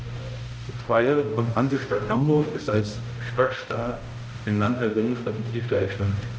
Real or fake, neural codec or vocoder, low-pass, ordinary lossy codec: fake; codec, 16 kHz, 0.5 kbps, X-Codec, HuBERT features, trained on balanced general audio; none; none